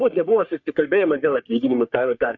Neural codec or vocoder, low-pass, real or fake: codec, 44.1 kHz, 3.4 kbps, Pupu-Codec; 7.2 kHz; fake